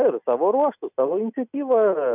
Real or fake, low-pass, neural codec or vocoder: real; 3.6 kHz; none